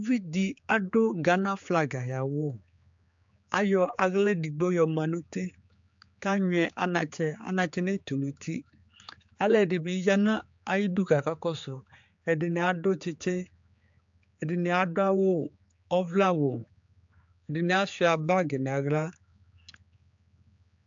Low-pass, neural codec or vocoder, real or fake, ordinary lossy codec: 7.2 kHz; codec, 16 kHz, 4 kbps, X-Codec, HuBERT features, trained on general audio; fake; AAC, 64 kbps